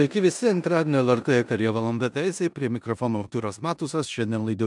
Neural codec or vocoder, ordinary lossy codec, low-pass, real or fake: codec, 16 kHz in and 24 kHz out, 0.9 kbps, LongCat-Audio-Codec, four codebook decoder; MP3, 96 kbps; 10.8 kHz; fake